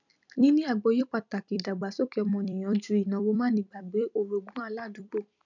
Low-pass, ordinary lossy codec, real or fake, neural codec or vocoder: 7.2 kHz; none; fake; vocoder, 44.1 kHz, 128 mel bands every 256 samples, BigVGAN v2